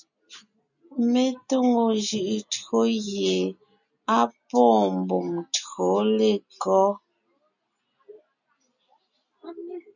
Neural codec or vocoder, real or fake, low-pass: none; real; 7.2 kHz